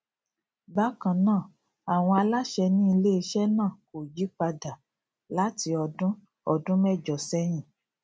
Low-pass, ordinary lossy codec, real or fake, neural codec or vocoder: none; none; real; none